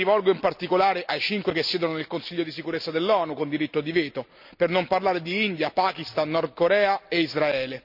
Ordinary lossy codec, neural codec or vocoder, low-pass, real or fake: none; none; 5.4 kHz; real